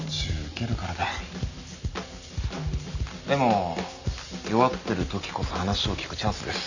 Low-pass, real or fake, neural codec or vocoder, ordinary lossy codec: 7.2 kHz; real; none; none